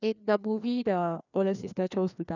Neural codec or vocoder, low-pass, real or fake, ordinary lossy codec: codec, 16 kHz, 2 kbps, FreqCodec, larger model; 7.2 kHz; fake; none